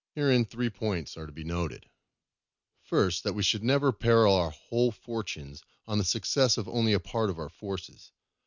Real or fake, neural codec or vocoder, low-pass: real; none; 7.2 kHz